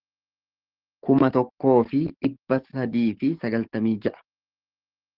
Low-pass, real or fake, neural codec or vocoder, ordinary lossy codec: 5.4 kHz; fake; codec, 44.1 kHz, 7.8 kbps, DAC; Opus, 16 kbps